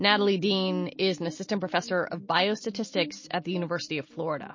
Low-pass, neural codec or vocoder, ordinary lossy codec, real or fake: 7.2 kHz; none; MP3, 32 kbps; real